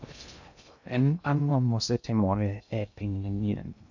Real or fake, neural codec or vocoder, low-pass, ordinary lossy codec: fake; codec, 16 kHz in and 24 kHz out, 0.6 kbps, FocalCodec, streaming, 2048 codes; 7.2 kHz; none